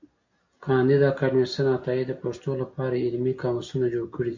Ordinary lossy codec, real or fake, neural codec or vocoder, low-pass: MP3, 64 kbps; real; none; 7.2 kHz